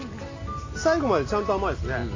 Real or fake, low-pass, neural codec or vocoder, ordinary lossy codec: real; 7.2 kHz; none; AAC, 32 kbps